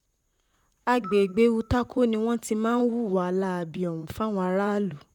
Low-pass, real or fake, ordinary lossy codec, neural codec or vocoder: 19.8 kHz; fake; none; vocoder, 44.1 kHz, 128 mel bands, Pupu-Vocoder